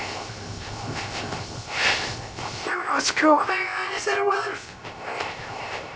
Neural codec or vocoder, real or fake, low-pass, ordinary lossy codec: codec, 16 kHz, 0.3 kbps, FocalCodec; fake; none; none